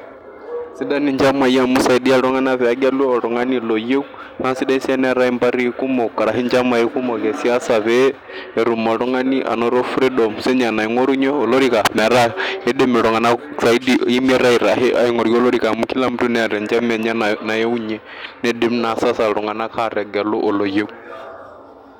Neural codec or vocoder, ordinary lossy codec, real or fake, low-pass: none; none; real; 19.8 kHz